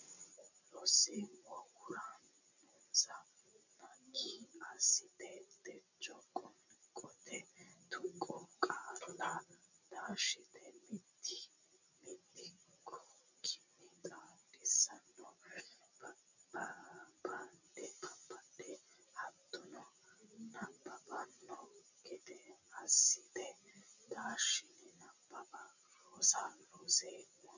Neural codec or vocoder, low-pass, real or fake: none; 7.2 kHz; real